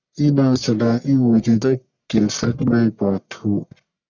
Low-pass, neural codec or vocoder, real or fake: 7.2 kHz; codec, 44.1 kHz, 1.7 kbps, Pupu-Codec; fake